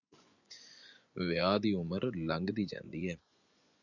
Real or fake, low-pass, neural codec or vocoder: fake; 7.2 kHz; vocoder, 24 kHz, 100 mel bands, Vocos